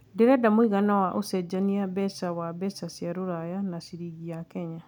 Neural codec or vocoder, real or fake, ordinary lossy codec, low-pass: none; real; none; 19.8 kHz